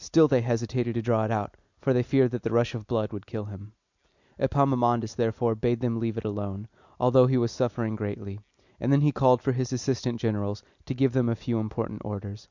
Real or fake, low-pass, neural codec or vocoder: real; 7.2 kHz; none